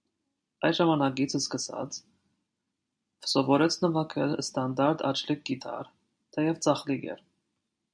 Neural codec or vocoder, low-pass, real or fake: none; 9.9 kHz; real